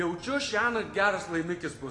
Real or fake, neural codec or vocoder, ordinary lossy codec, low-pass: real; none; AAC, 32 kbps; 10.8 kHz